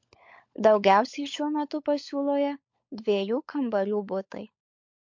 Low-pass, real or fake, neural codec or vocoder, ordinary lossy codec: 7.2 kHz; fake; codec, 16 kHz, 16 kbps, FunCodec, trained on LibriTTS, 50 frames a second; MP3, 48 kbps